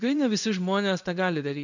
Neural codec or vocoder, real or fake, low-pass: codec, 16 kHz in and 24 kHz out, 1 kbps, XY-Tokenizer; fake; 7.2 kHz